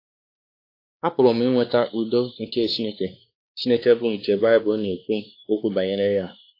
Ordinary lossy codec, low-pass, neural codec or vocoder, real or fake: AAC, 32 kbps; 5.4 kHz; codec, 16 kHz, 2 kbps, X-Codec, WavLM features, trained on Multilingual LibriSpeech; fake